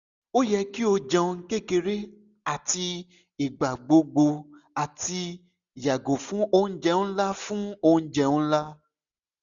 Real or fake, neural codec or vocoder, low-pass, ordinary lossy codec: real; none; 7.2 kHz; none